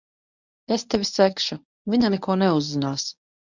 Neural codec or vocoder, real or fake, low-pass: codec, 24 kHz, 0.9 kbps, WavTokenizer, medium speech release version 1; fake; 7.2 kHz